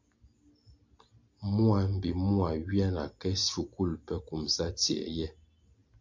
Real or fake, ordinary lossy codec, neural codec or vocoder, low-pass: real; MP3, 64 kbps; none; 7.2 kHz